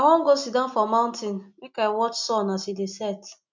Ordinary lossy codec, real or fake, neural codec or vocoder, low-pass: MP3, 64 kbps; real; none; 7.2 kHz